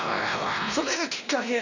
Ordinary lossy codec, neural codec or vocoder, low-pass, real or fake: none; codec, 16 kHz, 1 kbps, X-Codec, WavLM features, trained on Multilingual LibriSpeech; 7.2 kHz; fake